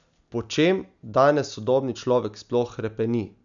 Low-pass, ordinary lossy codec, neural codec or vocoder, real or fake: 7.2 kHz; none; none; real